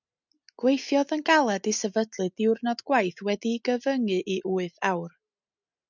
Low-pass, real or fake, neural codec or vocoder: 7.2 kHz; real; none